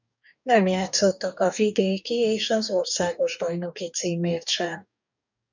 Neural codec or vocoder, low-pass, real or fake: codec, 44.1 kHz, 2.6 kbps, DAC; 7.2 kHz; fake